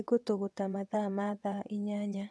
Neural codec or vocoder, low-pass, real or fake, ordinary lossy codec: vocoder, 22.05 kHz, 80 mel bands, WaveNeXt; none; fake; none